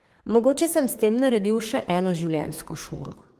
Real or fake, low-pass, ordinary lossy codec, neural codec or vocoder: fake; 14.4 kHz; Opus, 24 kbps; codec, 32 kHz, 1.9 kbps, SNAC